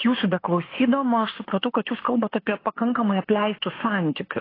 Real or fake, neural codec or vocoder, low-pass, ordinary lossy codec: fake; autoencoder, 48 kHz, 32 numbers a frame, DAC-VAE, trained on Japanese speech; 5.4 kHz; AAC, 24 kbps